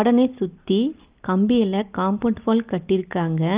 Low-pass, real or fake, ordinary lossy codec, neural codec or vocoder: 3.6 kHz; real; Opus, 32 kbps; none